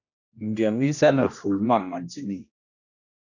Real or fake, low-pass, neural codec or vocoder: fake; 7.2 kHz; codec, 16 kHz, 1 kbps, X-Codec, HuBERT features, trained on general audio